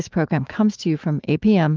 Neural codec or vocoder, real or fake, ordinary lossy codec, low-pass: autoencoder, 48 kHz, 128 numbers a frame, DAC-VAE, trained on Japanese speech; fake; Opus, 32 kbps; 7.2 kHz